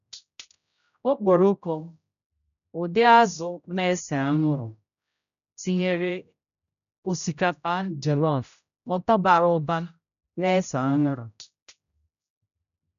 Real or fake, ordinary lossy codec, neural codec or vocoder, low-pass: fake; none; codec, 16 kHz, 0.5 kbps, X-Codec, HuBERT features, trained on general audio; 7.2 kHz